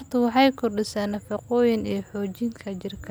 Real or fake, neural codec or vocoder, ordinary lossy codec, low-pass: real; none; none; none